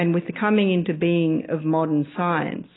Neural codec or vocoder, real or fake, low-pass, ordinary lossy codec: none; real; 7.2 kHz; AAC, 16 kbps